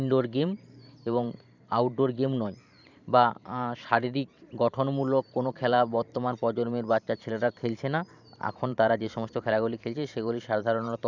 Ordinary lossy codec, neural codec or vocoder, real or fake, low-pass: none; vocoder, 44.1 kHz, 128 mel bands every 512 samples, BigVGAN v2; fake; 7.2 kHz